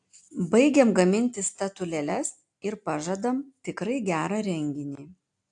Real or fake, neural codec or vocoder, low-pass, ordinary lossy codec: real; none; 9.9 kHz; AAC, 64 kbps